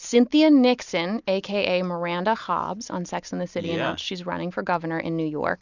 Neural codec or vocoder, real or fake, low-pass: none; real; 7.2 kHz